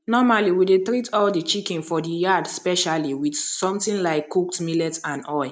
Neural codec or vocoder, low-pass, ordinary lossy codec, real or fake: none; none; none; real